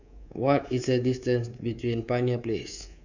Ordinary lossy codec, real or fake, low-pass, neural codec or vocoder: none; fake; 7.2 kHz; codec, 24 kHz, 3.1 kbps, DualCodec